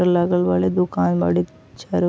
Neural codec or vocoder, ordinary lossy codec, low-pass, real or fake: none; none; none; real